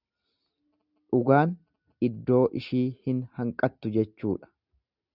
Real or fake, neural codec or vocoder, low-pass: real; none; 5.4 kHz